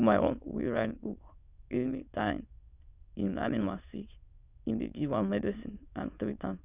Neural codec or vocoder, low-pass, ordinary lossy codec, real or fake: autoencoder, 22.05 kHz, a latent of 192 numbers a frame, VITS, trained on many speakers; 3.6 kHz; Opus, 64 kbps; fake